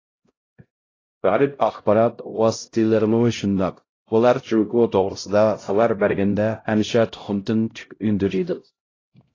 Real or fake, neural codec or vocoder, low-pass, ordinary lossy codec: fake; codec, 16 kHz, 0.5 kbps, X-Codec, HuBERT features, trained on LibriSpeech; 7.2 kHz; AAC, 32 kbps